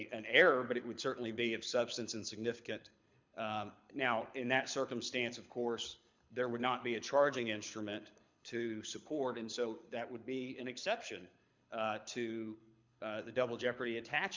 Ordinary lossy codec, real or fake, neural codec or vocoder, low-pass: MP3, 64 kbps; fake; codec, 24 kHz, 6 kbps, HILCodec; 7.2 kHz